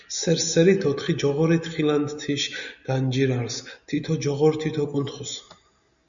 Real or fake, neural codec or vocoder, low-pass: real; none; 7.2 kHz